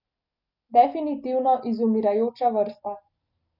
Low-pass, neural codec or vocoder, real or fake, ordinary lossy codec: 5.4 kHz; none; real; none